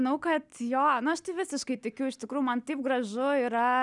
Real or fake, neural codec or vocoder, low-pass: real; none; 10.8 kHz